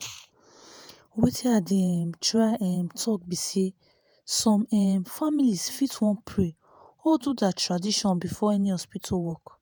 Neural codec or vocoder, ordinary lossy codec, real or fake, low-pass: vocoder, 48 kHz, 128 mel bands, Vocos; none; fake; none